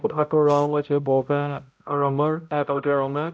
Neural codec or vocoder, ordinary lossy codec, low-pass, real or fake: codec, 16 kHz, 0.5 kbps, X-Codec, HuBERT features, trained on balanced general audio; none; none; fake